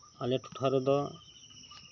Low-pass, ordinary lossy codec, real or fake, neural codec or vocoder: 7.2 kHz; none; real; none